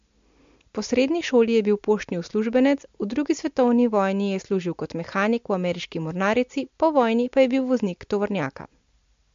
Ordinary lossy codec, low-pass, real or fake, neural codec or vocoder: MP3, 48 kbps; 7.2 kHz; real; none